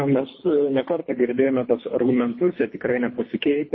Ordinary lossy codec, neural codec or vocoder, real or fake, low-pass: MP3, 24 kbps; codec, 24 kHz, 3 kbps, HILCodec; fake; 7.2 kHz